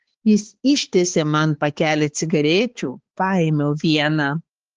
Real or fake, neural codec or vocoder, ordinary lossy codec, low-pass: fake; codec, 16 kHz, 4 kbps, X-Codec, HuBERT features, trained on balanced general audio; Opus, 16 kbps; 7.2 kHz